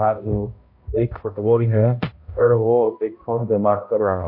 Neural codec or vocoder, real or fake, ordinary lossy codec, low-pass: codec, 16 kHz, 0.5 kbps, X-Codec, HuBERT features, trained on balanced general audio; fake; MP3, 32 kbps; 5.4 kHz